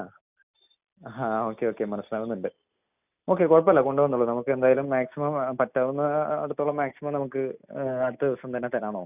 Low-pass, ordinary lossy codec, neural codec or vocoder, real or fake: 3.6 kHz; none; none; real